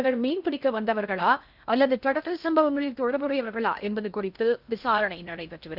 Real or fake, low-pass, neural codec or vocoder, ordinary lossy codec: fake; 5.4 kHz; codec, 16 kHz in and 24 kHz out, 0.6 kbps, FocalCodec, streaming, 2048 codes; none